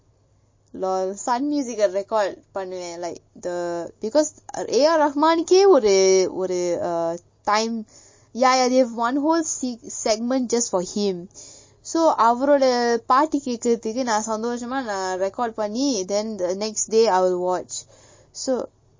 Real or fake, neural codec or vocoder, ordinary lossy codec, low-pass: real; none; MP3, 32 kbps; 7.2 kHz